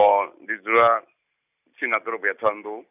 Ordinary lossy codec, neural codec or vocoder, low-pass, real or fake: none; none; 3.6 kHz; real